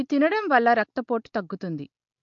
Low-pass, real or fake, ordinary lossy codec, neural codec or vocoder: 7.2 kHz; real; MP3, 48 kbps; none